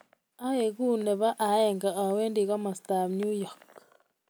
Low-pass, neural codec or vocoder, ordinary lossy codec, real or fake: none; none; none; real